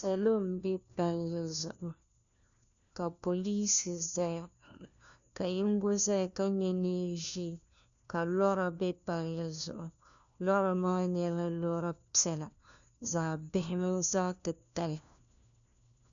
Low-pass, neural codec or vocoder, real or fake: 7.2 kHz; codec, 16 kHz, 1 kbps, FunCodec, trained on LibriTTS, 50 frames a second; fake